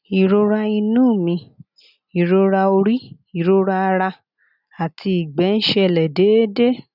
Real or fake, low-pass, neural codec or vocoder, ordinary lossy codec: real; 5.4 kHz; none; none